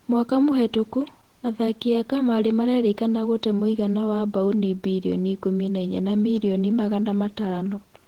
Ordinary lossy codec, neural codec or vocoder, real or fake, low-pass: Opus, 16 kbps; vocoder, 48 kHz, 128 mel bands, Vocos; fake; 19.8 kHz